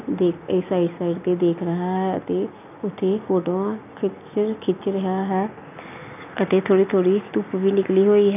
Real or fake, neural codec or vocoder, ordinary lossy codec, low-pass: real; none; none; 3.6 kHz